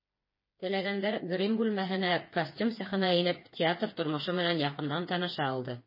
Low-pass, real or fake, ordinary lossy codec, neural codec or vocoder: 5.4 kHz; fake; MP3, 24 kbps; codec, 16 kHz, 4 kbps, FreqCodec, smaller model